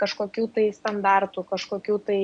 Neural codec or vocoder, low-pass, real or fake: none; 9.9 kHz; real